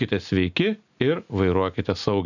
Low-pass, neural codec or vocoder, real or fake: 7.2 kHz; none; real